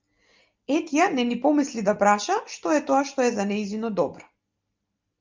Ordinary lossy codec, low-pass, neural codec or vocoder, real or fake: Opus, 24 kbps; 7.2 kHz; none; real